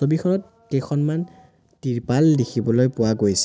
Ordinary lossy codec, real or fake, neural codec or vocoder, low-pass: none; real; none; none